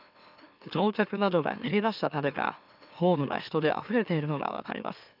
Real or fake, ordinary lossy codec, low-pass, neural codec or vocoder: fake; none; 5.4 kHz; autoencoder, 44.1 kHz, a latent of 192 numbers a frame, MeloTTS